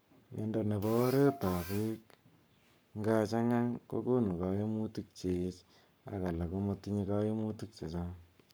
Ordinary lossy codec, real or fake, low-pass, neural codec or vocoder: none; fake; none; codec, 44.1 kHz, 7.8 kbps, Pupu-Codec